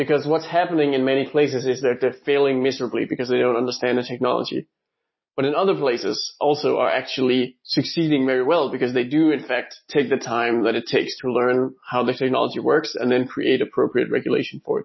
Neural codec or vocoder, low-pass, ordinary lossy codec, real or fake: none; 7.2 kHz; MP3, 24 kbps; real